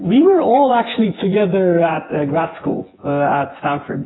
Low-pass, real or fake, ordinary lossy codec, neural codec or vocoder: 7.2 kHz; fake; AAC, 16 kbps; vocoder, 24 kHz, 100 mel bands, Vocos